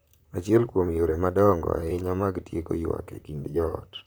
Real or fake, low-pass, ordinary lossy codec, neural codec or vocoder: fake; none; none; vocoder, 44.1 kHz, 128 mel bands, Pupu-Vocoder